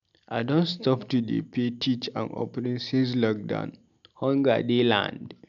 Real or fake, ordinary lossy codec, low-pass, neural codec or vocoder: real; none; 7.2 kHz; none